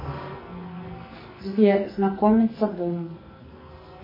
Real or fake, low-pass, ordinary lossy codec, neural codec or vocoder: fake; 5.4 kHz; MP3, 24 kbps; codec, 44.1 kHz, 2.6 kbps, SNAC